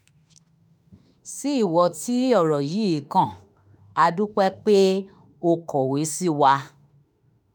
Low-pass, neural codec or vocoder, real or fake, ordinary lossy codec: none; autoencoder, 48 kHz, 32 numbers a frame, DAC-VAE, trained on Japanese speech; fake; none